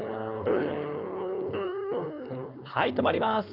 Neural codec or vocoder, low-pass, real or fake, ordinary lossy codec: codec, 16 kHz, 4.8 kbps, FACodec; 5.4 kHz; fake; none